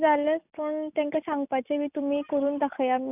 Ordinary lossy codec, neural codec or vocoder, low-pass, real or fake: none; none; 3.6 kHz; real